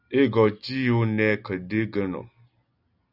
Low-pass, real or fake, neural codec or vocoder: 5.4 kHz; real; none